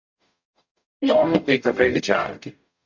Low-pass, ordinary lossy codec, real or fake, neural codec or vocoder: 7.2 kHz; MP3, 64 kbps; fake; codec, 44.1 kHz, 0.9 kbps, DAC